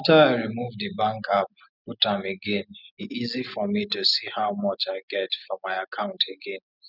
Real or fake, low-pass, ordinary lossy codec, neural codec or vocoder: real; 5.4 kHz; none; none